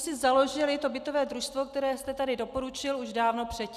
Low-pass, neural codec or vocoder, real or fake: 14.4 kHz; none; real